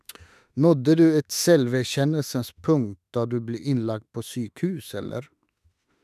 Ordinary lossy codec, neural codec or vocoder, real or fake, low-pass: none; autoencoder, 48 kHz, 32 numbers a frame, DAC-VAE, trained on Japanese speech; fake; 14.4 kHz